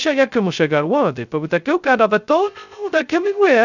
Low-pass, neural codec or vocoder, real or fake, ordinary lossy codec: 7.2 kHz; codec, 16 kHz, 0.2 kbps, FocalCodec; fake; none